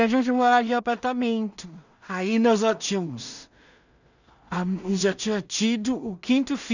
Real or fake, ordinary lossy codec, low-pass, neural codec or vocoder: fake; none; 7.2 kHz; codec, 16 kHz in and 24 kHz out, 0.4 kbps, LongCat-Audio-Codec, two codebook decoder